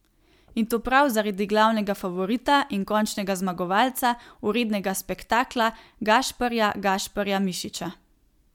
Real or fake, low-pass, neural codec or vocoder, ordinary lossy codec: real; 19.8 kHz; none; MP3, 96 kbps